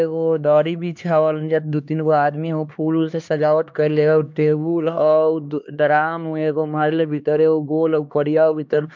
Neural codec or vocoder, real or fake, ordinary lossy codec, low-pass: codec, 16 kHz, 2 kbps, X-Codec, HuBERT features, trained on LibriSpeech; fake; MP3, 64 kbps; 7.2 kHz